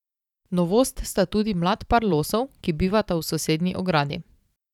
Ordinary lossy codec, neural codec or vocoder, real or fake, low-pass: none; vocoder, 44.1 kHz, 128 mel bands every 512 samples, BigVGAN v2; fake; 19.8 kHz